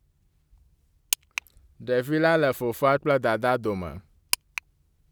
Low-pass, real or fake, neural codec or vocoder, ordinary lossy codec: none; real; none; none